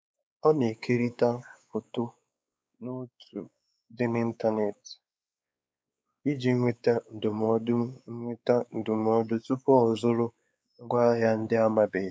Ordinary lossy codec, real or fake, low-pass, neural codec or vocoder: none; fake; none; codec, 16 kHz, 4 kbps, X-Codec, WavLM features, trained on Multilingual LibriSpeech